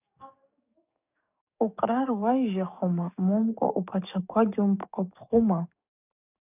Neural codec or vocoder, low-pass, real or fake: codec, 44.1 kHz, 7.8 kbps, DAC; 3.6 kHz; fake